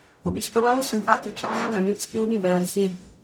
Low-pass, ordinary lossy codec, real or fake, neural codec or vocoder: none; none; fake; codec, 44.1 kHz, 0.9 kbps, DAC